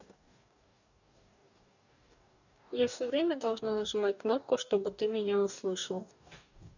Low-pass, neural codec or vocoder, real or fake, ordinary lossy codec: 7.2 kHz; codec, 44.1 kHz, 2.6 kbps, DAC; fake; none